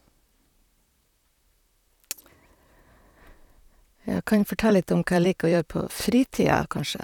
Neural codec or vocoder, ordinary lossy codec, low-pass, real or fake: vocoder, 44.1 kHz, 128 mel bands, Pupu-Vocoder; none; 19.8 kHz; fake